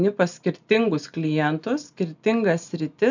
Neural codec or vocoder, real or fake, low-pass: none; real; 7.2 kHz